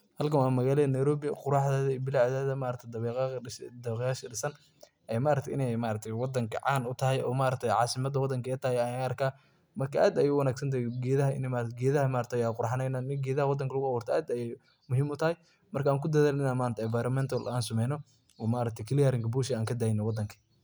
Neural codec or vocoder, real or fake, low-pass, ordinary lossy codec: none; real; none; none